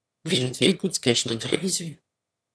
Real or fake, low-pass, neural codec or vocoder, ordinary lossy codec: fake; none; autoencoder, 22.05 kHz, a latent of 192 numbers a frame, VITS, trained on one speaker; none